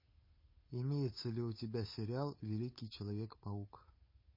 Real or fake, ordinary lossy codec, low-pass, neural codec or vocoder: fake; MP3, 24 kbps; 5.4 kHz; codec, 16 kHz, 8 kbps, FunCodec, trained on Chinese and English, 25 frames a second